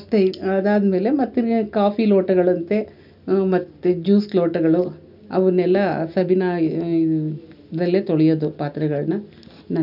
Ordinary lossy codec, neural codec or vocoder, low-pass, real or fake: none; none; 5.4 kHz; real